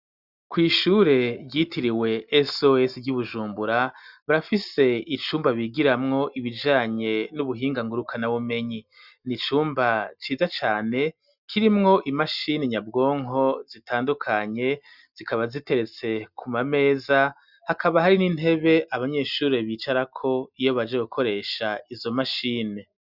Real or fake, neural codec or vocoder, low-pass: real; none; 5.4 kHz